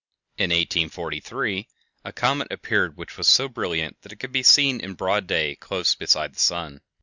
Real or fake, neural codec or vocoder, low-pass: real; none; 7.2 kHz